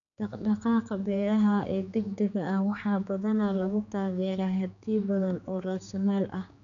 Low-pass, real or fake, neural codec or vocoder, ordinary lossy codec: 7.2 kHz; fake; codec, 16 kHz, 4 kbps, X-Codec, HuBERT features, trained on general audio; none